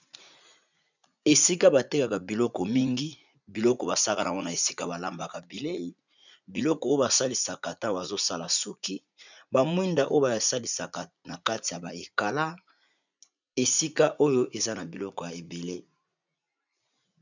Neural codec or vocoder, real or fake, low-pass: vocoder, 44.1 kHz, 80 mel bands, Vocos; fake; 7.2 kHz